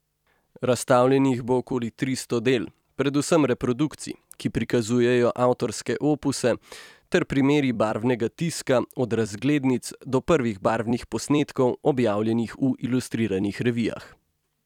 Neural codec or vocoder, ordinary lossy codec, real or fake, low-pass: vocoder, 44.1 kHz, 128 mel bands every 256 samples, BigVGAN v2; none; fake; 19.8 kHz